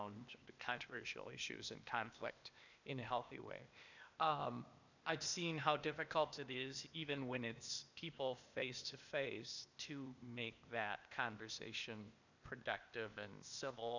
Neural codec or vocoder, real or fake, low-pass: codec, 16 kHz, 0.8 kbps, ZipCodec; fake; 7.2 kHz